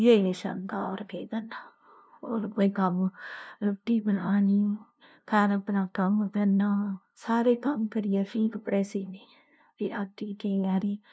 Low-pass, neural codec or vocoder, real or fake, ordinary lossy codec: none; codec, 16 kHz, 0.5 kbps, FunCodec, trained on LibriTTS, 25 frames a second; fake; none